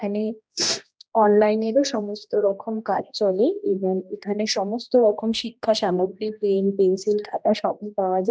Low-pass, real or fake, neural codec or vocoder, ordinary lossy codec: none; fake; codec, 16 kHz, 1 kbps, X-Codec, HuBERT features, trained on general audio; none